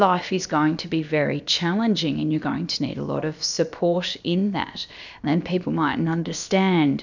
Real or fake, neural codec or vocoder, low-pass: fake; codec, 16 kHz, about 1 kbps, DyCAST, with the encoder's durations; 7.2 kHz